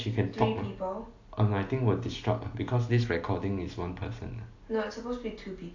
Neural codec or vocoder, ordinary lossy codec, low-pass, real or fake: none; AAC, 48 kbps; 7.2 kHz; real